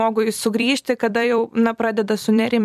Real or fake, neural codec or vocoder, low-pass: fake; vocoder, 44.1 kHz, 128 mel bands every 256 samples, BigVGAN v2; 14.4 kHz